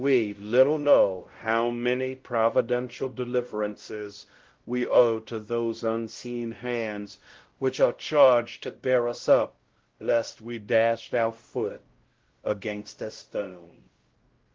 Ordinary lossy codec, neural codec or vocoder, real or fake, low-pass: Opus, 16 kbps; codec, 16 kHz, 0.5 kbps, X-Codec, WavLM features, trained on Multilingual LibriSpeech; fake; 7.2 kHz